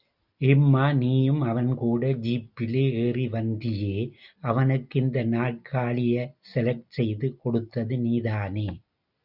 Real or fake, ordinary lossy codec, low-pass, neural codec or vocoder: real; Opus, 64 kbps; 5.4 kHz; none